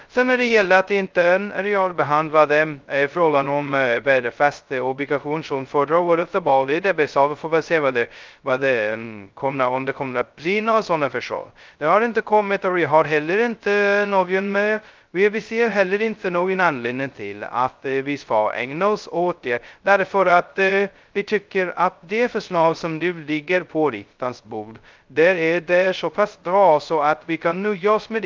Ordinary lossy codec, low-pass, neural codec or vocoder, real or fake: Opus, 24 kbps; 7.2 kHz; codec, 16 kHz, 0.2 kbps, FocalCodec; fake